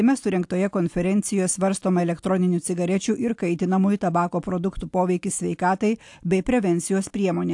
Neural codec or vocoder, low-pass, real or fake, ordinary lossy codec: none; 10.8 kHz; real; AAC, 64 kbps